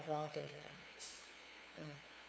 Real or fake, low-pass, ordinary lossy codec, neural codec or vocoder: fake; none; none; codec, 16 kHz, 2 kbps, FunCodec, trained on LibriTTS, 25 frames a second